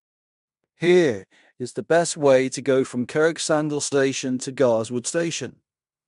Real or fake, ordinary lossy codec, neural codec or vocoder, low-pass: fake; none; codec, 16 kHz in and 24 kHz out, 0.9 kbps, LongCat-Audio-Codec, fine tuned four codebook decoder; 10.8 kHz